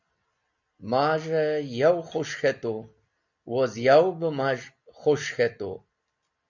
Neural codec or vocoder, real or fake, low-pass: none; real; 7.2 kHz